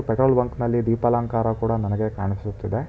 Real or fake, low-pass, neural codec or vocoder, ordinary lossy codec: real; none; none; none